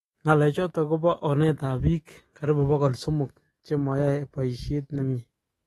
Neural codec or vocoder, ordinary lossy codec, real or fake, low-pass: autoencoder, 48 kHz, 128 numbers a frame, DAC-VAE, trained on Japanese speech; AAC, 32 kbps; fake; 19.8 kHz